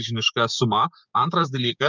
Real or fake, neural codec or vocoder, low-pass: fake; codec, 16 kHz, 6 kbps, DAC; 7.2 kHz